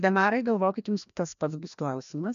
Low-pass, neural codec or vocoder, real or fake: 7.2 kHz; codec, 16 kHz, 1 kbps, FreqCodec, larger model; fake